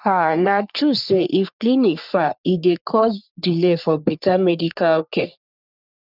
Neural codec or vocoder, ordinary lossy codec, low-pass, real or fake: codec, 44.1 kHz, 3.4 kbps, Pupu-Codec; none; 5.4 kHz; fake